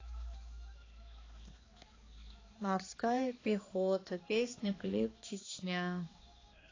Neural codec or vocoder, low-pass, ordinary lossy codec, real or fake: codec, 16 kHz, 2 kbps, X-Codec, HuBERT features, trained on balanced general audio; 7.2 kHz; AAC, 32 kbps; fake